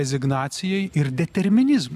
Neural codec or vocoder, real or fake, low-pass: none; real; 14.4 kHz